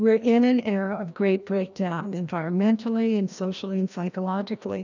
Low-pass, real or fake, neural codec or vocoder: 7.2 kHz; fake; codec, 16 kHz, 1 kbps, FreqCodec, larger model